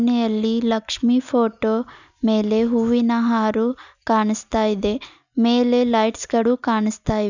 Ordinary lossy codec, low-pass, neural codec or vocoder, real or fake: none; 7.2 kHz; none; real